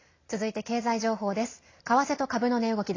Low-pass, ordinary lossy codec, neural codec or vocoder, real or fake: 7.2 kHz; AAC, 32 kbps; none; real